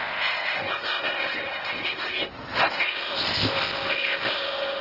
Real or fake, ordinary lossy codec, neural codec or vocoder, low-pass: fake; Opus, 16 kbps; codec, 16 kHz in and 24 kHz out, 0.8 kbps, FocalCodec, streaming, 65536 codes; 5.4 kHz